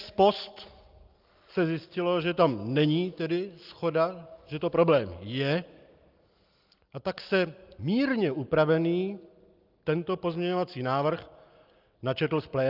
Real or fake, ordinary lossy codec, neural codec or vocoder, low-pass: real; Opus, 24 kbps; none; 5.4 kHz